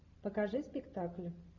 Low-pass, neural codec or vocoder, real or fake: 7.2 kHz; none; real